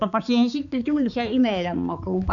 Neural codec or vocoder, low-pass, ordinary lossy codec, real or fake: codec, 16 kHz, 4 kbps, X-Codec, HuBERT features, trained on balanced general audio; 7.2 kHz; none; fake